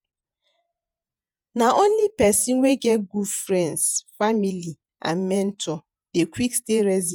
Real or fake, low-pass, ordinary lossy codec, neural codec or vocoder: fake; 19.8 kHz; none; vocoder, 48 kHz, 128 mel bands, Vocos